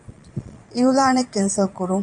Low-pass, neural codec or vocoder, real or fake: 9.9 kHz; vocoder, 22.05 kHz, 80 mel bands, Vocos; fake